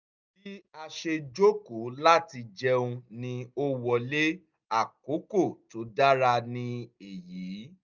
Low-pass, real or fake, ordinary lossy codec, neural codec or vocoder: 7.2 kHz; real; none; none